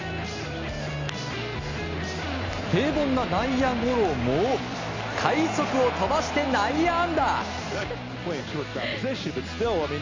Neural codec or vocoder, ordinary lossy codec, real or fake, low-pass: none; MP3, 64 kbps; real; 7.2 kHz